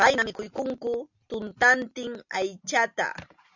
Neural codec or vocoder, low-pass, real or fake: none; 7.2 kHz; real